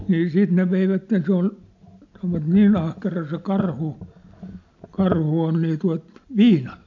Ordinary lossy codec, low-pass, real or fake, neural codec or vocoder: MP3, 64 kbps; 7.2 kHz; real; none